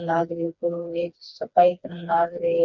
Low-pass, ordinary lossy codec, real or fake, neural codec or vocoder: 7.2 kHz; none; fake; codec, 16 kHz, 2 kbps, FreqCodec, smaller model